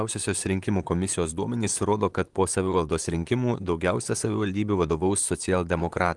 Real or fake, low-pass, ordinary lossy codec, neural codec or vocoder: fake; 10.8 kHz; Opus, 24 kbps; vocoder, 44.1 kHz, 128 mel bands, Pupu-Vocoder